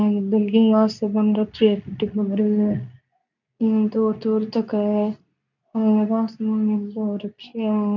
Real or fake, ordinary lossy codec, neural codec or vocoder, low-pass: fake; none; codec, 24 kHz, 0.9 kbps, WavTokenizer, medium speech release version 2; 7.2 kHz